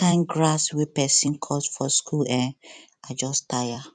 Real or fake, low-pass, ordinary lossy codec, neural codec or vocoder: fake; 9.9 kHz; none; vocoder, 44.1 kHz, 128 mel bands every 256 samples, BigVGAN v2